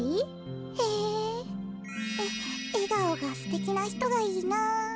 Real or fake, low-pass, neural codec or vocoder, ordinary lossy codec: real; none; none; none